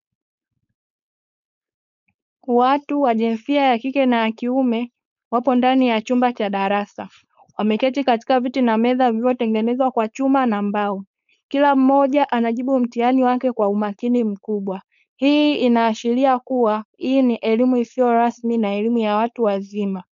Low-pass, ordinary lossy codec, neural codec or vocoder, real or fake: 7.2 kHz; MP3, 96 kbps; codec, 16 kHz, 4.8 kbps, FACodec; fake